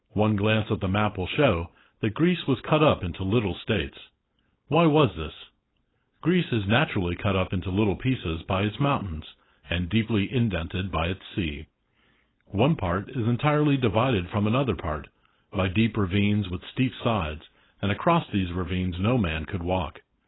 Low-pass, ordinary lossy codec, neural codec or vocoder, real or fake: 7.2 kHz; AAC, 16 kbps; codec, 16 kHz, 4.8 kbps, FACodec; fake